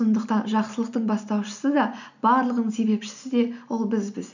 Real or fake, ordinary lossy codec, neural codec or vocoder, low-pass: real; none; none; 7.2 kHz